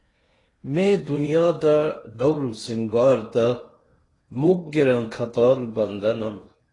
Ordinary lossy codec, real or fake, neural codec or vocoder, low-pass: AAC, 32 kbps; fake; codec, 16 kHz in and 24 kHz out, 0.8 kbps, FocalCodec, streaming, 65536 codes; 10.8 kHz